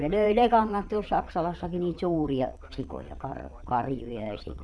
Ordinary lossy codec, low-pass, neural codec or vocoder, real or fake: none; none; vocoder, 22.05 kHz, 80 mel bands, Vocos; fake